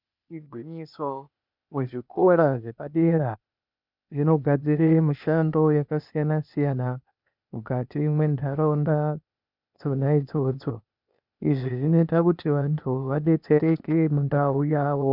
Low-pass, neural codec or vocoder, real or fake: 5.4 kHz; codec, 16 kHz, 0.8 kbps, ZipCodec; fake